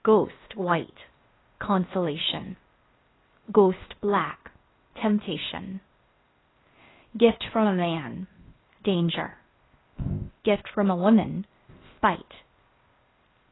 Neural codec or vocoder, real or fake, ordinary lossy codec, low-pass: codec, 16 kHz, 0.8 kbps, ZipCodec; fake; AAC, 16 kbps; 7.2 kHz